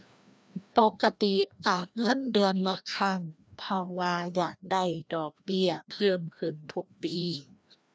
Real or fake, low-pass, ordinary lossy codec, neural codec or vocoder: fake; none; none; codec, 16 kHz, 1 kbps, FreqCodec, larger model